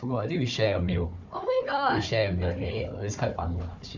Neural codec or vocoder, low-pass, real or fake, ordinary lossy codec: codec, 16 kHz, 4 kbps, FunCodec, trained on Chinese and English, 50 frames a second; 7.2 kHz; fake; MP3, 64 kbps